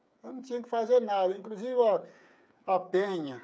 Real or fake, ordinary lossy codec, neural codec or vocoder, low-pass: fake; none; codec, 16 kHz, 8 kbps, FreqCodec, smaller model; none